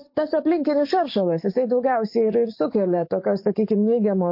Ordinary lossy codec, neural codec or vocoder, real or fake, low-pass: MP3, 32 kbps; codec, 44.1 kHz, 7.8 kbps, DAC; fake; 5.4 kHz